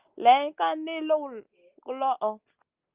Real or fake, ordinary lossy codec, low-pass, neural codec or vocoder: real; Opus, 24 kbps; 3.6 kHz; none